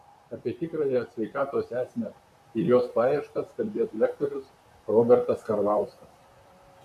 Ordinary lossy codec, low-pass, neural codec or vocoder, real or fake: AAC, 96 kbps; 14.4 kHz; vocoder, 44.1 kHz, 128 mel bands, Pupu-Vocoder; fake